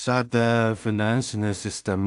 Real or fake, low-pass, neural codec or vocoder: fake; 10.8 kHz; codec, 16 kHz in and 24 kHz out, 0.4 kbps, LongCat-Audio-Codec, two codebook decoder